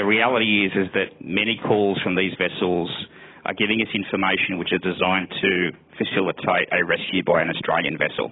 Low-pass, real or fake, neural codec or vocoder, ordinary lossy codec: 7.2 kHz; real; none; AAC, 16 kbps